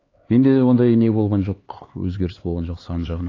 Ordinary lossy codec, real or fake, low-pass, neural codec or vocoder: AAC, 32 kbps; fake; 7.2 kHz; codec, 16 kHz, 4 kbps, X-Codec, HuBERT features, trained on LibriSpeech